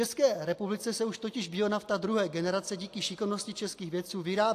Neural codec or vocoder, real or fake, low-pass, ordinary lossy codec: none; real; 14.4 kHz; AAC, 64 kbps